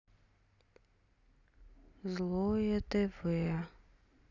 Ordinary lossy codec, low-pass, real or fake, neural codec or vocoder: none; 7.2 kHz; real; none